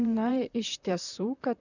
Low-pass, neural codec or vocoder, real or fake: 7.2 kHz; vocoder, 44.1 kHz, 128 mel bands, Pupu-Vocoder; fake